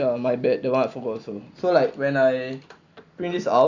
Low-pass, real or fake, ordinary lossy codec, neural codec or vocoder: 7.2 kHz; real; Opus, 64 kbps; none